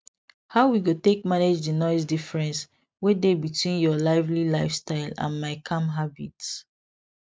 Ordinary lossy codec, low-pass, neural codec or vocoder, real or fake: none; none; none; real